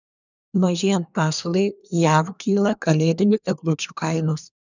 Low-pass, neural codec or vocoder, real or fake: 7.2 kHz; codec, 24 kHz, 1 kbps, SNAC; fake